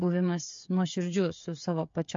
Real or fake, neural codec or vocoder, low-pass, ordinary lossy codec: fake; codec, 16 kHz, 8 kbps, FreqCodec, smaller model; 7.2 kHz; MP3, 48 kbps